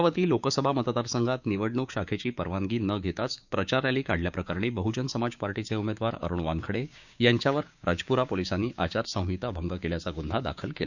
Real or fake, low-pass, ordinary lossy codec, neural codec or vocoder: fake; 7.2 kHz; none; codec, 16 kHz, 6 kbps, DAC